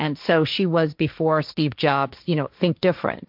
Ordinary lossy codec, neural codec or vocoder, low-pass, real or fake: MP3, 48 kbps; codec, 16 kHz, 1.1 kbps, Voila-Tokenizer; 5.4 kHz; fake